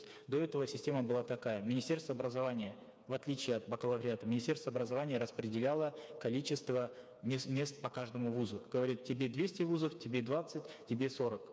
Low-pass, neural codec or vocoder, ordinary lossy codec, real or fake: none; codec, 16 kHz, 4 kbps, FreqCodec, smaller model; none; fake